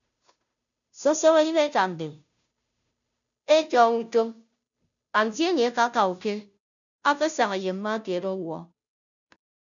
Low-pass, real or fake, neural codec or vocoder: 7.2 kHz; fake; codec, 16 kHz, 0.5 kbps, FunCodec, trained on Chinese and English, 25 frames a second